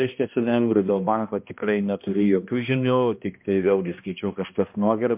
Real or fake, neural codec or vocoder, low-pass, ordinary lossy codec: fake; codec, 16 kHz, 1 kbps, X-Codec, HuBERT features, trained on balanced general audio; 3.6 kHz; MP3, 32 kbps